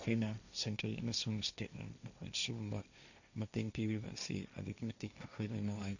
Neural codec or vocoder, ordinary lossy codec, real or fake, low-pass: codec, 16 kHz, 1.1 kbps, Voila-Tokenizer; none; fake; 7.2 kHz